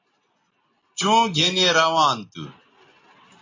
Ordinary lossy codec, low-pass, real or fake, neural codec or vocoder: AAC, 32 kbps; 7.2 kHz; real; none